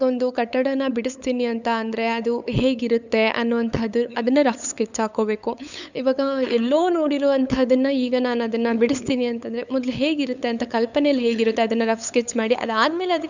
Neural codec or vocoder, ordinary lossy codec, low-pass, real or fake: codec, 16 kHz, 8 kbps, FunCodec, trained on LibriTTS, 25 frames a second; none; 7.2 kHz; fake